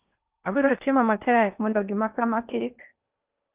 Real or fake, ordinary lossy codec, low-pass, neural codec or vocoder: fake; Opus, 24 kbps; 3.6 kHz; codec, 16 kHz in and 24 kHz out, 0.8 kbps, FocalCodec, streaming, 65536 codes